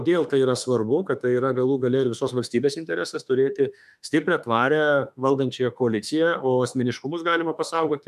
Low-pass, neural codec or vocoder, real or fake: 14.4 kHz; autoencoder, 48 kHz, 32 numbers a frame, DAC-VAE, trained on Japanese speech; fake